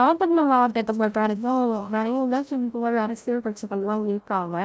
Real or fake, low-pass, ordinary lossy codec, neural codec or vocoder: fake; none; none; codec, 16 kHz, 0.5 kbps, FreqCodec, larger model